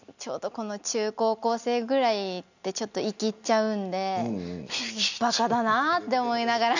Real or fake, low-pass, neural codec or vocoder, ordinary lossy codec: real; 7.2 kHz; none; none